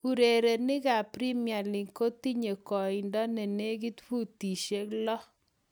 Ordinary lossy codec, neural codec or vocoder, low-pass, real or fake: none; none; none; real